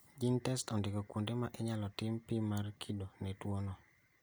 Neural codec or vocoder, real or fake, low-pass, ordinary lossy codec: none; real; none; none